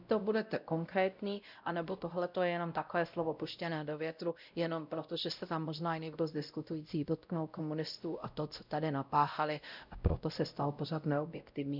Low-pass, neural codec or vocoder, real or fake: 5.4 kHz; codec, 16 kHz, 0.5 kbps, X-Codec, WavLM features, trained on Multilingual LibriSpeech; fake